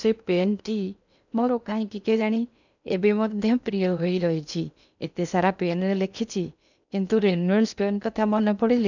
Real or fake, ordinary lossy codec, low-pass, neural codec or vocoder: fake; none; 7.2 kHz; codec, 16 kHz in and 24 kHz out, 0.8 kbps, FocalCodec, streaming, 65536 codes